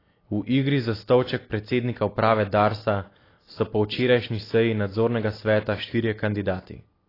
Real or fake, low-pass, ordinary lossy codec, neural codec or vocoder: real; 5.4 kHz; AAC, 24 kbps; none